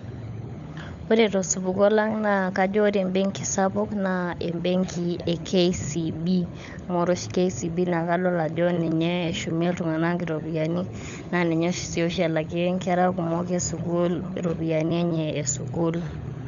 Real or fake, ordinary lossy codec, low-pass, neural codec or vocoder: fake; none; 7.2 kHz; codec, 16 kHz, 16 kbps, FunCodec, trained on LibriTTS, 50 frames a second